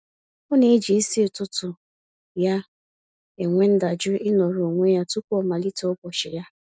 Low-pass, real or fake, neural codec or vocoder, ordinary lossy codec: none; real; none; none